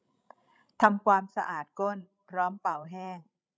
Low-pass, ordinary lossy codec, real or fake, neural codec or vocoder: none; none; fake; codec, 16 kHz, 16 kbps, FreqCodec, larger model